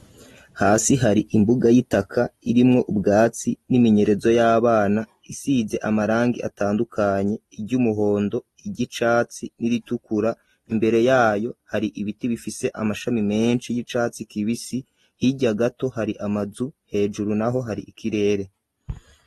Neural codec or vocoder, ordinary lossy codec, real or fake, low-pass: none; AAC, 32 kbps; real; 19.8 kHz